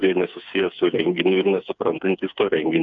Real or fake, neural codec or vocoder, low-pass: fake; codec, 16 kHz, 4 kbps, FreqCodec, smaller model; 7.2 kHz